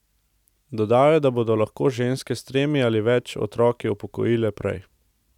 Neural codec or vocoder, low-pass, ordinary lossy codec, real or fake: none; 19.8 kHz; none; real